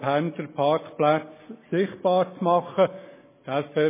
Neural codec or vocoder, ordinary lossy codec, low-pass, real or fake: none; MP3, 16 kbps; 3.6 kHz; real